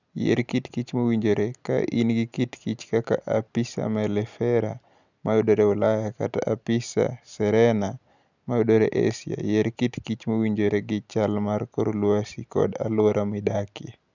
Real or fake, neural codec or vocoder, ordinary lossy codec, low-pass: real; none; none; 7.2 kHz